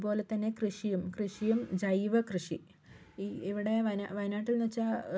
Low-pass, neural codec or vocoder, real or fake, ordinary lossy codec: none; none; real; none